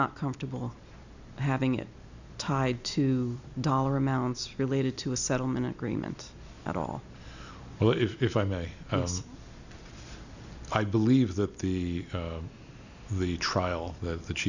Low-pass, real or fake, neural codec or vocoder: 7.2 kHz; real; none